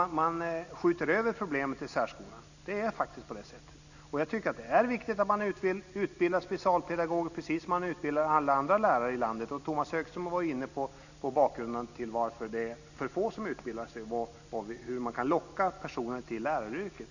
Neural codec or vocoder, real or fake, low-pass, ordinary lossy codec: none; real; 7.2 kHz; none